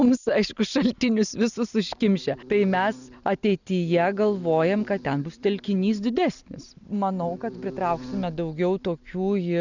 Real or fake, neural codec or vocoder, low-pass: real; none; 7.2 kHz